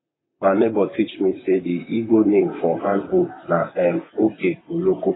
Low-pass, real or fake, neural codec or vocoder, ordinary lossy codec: 7.2 kHz; fake; vocoder, 44.1 kHz, 128 mel bands, Pupu-Vocoder; AAC, 16 kbps